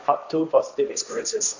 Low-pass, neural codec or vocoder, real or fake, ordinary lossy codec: 7.2 kHz; codec, 16 kHz, 1.1 kbps, Voila-Tokenizer; fake; none